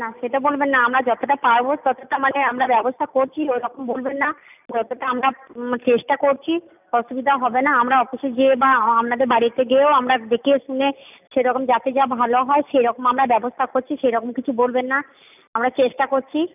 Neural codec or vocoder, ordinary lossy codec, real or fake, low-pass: none; none; real; 3.6 kHz